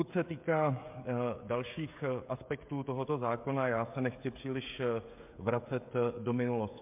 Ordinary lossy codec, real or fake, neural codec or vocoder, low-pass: MP3, 32 kbps; fake; codec, 16 kHz, 16 kbps, FreqCodec, smaller model; 3.6 kHz